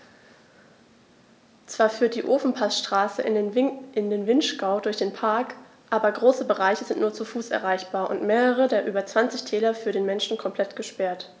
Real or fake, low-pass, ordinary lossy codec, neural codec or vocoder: real; none; none; none